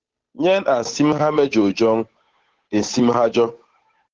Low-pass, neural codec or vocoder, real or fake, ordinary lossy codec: 7.2 kHz; codec, 16 kHz, 8 kbps, FunCodec, trained on Chinese and English, 25 frames a second; fake; Opus, 16 kbps